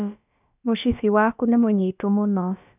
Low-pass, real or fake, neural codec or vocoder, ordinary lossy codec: 3.6 kHz; fake; codec, 16 kHz, about 1 kbps, DyCAST, with the encoder's durations; none